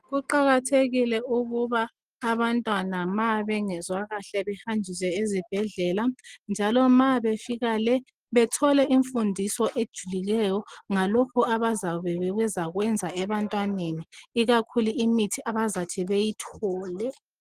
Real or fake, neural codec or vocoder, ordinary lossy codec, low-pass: real; none; Opus, 24 kbps; 14.4 kHz